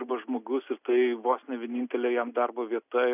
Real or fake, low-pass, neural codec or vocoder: real; 3.6 kHz; none